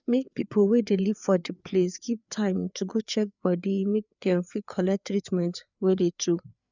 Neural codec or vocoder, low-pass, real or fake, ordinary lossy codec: codec, 16 kHz, 4 kbps, FreqCodec, larger model; 7.2 kHz; fake; none